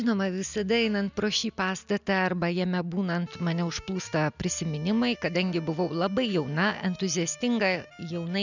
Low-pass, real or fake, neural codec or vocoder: 7.2 kHz; real; none